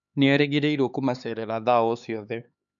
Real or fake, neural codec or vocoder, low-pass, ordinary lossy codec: fake; codec, 16 kHz, 4 kbps, X-Codec, HuBERT features, trained on LibriSpeech; 7.2 kHz; none